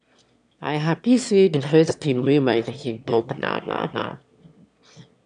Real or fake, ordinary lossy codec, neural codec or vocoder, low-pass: fake; none; autoencoder, 22.05 kHz, a latent of 192 numbers a frame, VITS, trained on one speaker; 9.9 kHz